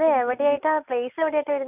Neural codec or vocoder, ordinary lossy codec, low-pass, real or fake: none; none; 3.6 kHz; real